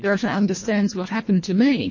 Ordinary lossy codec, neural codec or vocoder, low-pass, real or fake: MP3, 32 kbps; codec, 24 kHz, 1.5 kbps, HILCodec; 7.2 kHz; fake